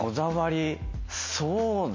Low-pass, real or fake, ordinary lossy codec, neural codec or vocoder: 7.2 kHz; real; MP3, 32 kbps; none